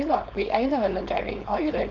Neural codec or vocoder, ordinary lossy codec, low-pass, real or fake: codec, 16 kHz, 4.8 kbps, FACodec; none; 7.2 kHz; fake